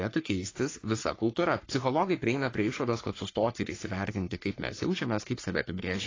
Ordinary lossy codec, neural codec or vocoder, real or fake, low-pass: AAC, 32 kbps; codec, 44.1 kHz, 3.4 kbps, Pupu-Codec; fake; 7.2 kHz